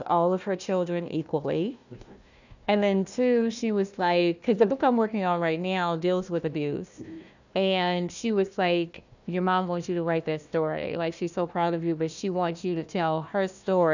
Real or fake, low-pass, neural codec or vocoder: fake; 7.2 kHz; codec, 16 kHz, 1 kbps, FunCodec, trained on Chinese and English, 50 frames a second